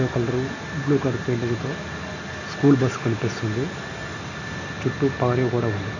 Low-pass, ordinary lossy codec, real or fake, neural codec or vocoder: 7.2 kHz; none; real; none